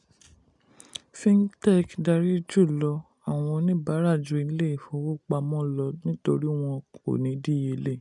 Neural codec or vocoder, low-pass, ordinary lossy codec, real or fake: none; 10.8 kHz; none; real